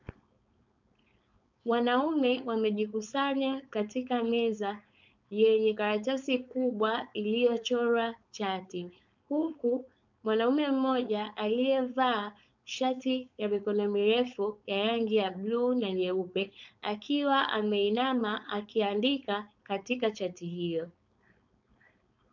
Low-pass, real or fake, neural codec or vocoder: 7.2 kHz; fake; codec, 16 kHz, 4.8 kbps, FACodec